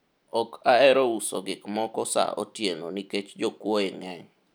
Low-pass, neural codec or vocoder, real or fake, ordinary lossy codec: none; none; real; none